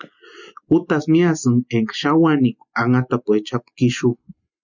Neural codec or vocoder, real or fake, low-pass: none; real; 7.2 kHz